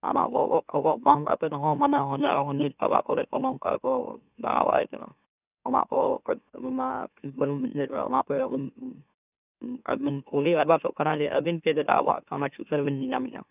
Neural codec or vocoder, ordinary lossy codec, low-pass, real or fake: autoencoder, 44.1 kHz, a latent of 192 numbers a frame, MeloTTS; none; 3.6 kHz; fake